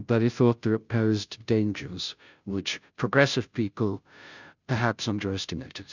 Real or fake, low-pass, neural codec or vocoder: fake; 7.2 kHz; codec, 16 kHz, 0.5 kbps, FunCodec, trained on Chinese and English, 25 frames a second